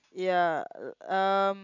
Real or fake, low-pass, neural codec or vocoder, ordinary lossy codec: real; 7.2 kHz; none; none